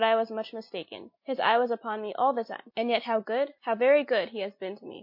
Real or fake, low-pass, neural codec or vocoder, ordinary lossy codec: real; 5.4 kHz; none; MP3, 32 kbps